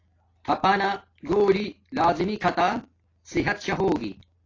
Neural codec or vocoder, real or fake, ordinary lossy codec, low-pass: none; real; AAC, 32 kbps; 7.2 kHz